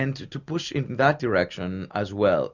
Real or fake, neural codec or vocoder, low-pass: real; none; 7.2 kHz